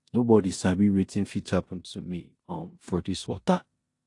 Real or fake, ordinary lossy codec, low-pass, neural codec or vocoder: fake; AAC, 48 kbps; 10.8 kHz; codec, 16 kHz in and 24 kHz out, 0.9 kbps, LongCat-Audio-Codec, four codebook decoder